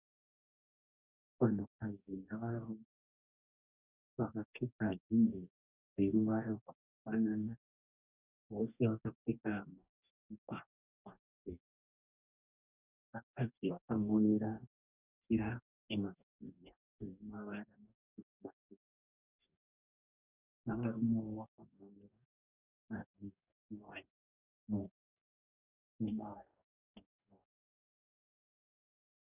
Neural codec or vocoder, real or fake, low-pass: codec, 44.1 kHz, 2.6 kbps, DAC; fake; 3.6 kHz